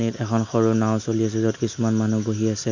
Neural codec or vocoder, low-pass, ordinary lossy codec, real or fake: none; 7.2 kHz; none; real